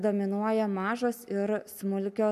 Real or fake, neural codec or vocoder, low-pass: real; none; 14.4 kHz